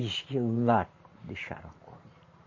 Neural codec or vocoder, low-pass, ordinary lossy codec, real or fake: vocoder, 44.1 kHz, 128 mel bands every 512 samples, BigVGAN v2; 7.2 kHz; MP3, 32 kbps; fake